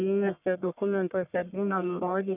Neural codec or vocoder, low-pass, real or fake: codec, 44.1 kHz, 1.7 kbps, Pupu-Codec; 3.6 kHz; fake